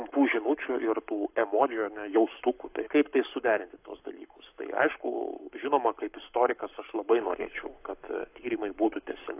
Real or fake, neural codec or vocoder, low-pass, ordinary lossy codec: fake; codec, 44.1 kHz, 7.8 kbps, Pupu-Codec; 3.6 kHz; AAC, 32 kbps